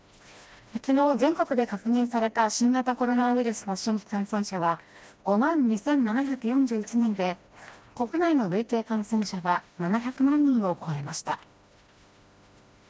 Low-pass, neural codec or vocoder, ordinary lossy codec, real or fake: none; codec, 16 kHz, 1 kbps, FreqCodec, smaller model; none; fake